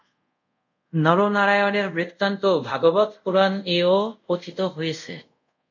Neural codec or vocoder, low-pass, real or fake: codec, 24 kHz, 0.5 kbps, DualCodec; 7.2 kHz; fake